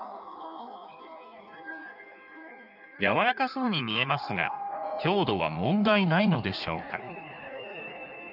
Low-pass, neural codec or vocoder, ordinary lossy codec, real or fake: 5.4 kHz; codec, 16 kHz in and 24 kHz out, 1.1 kbps, FireRedTTS-2 codec; none; fake